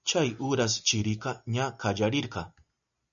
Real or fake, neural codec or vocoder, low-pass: real; none; 7.2 kHz